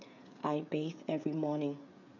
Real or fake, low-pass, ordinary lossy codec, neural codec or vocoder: fake; 7.2 kHz; none; codec, 16 kHz, 16 kbps, FreqCodec, smaller model